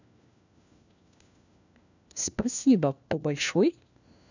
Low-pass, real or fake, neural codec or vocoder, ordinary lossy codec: 7.2 kHz; fake; codec, 16 kHz, 1 kbps, FunCodec, trained on LibriTTS, 50 frames a second; none